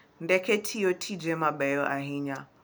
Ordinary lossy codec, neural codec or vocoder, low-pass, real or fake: none; none; none; real